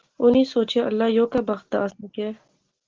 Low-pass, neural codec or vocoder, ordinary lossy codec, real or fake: 7.2 kHz; none; Opus, 16 kbps; real